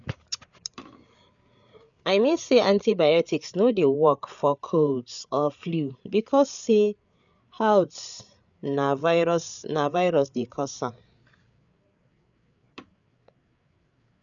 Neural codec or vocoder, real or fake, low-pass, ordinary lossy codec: codec, 16 kHz, 8 kbps, FreqCodec, larger model; fake; 7.2 kHz; none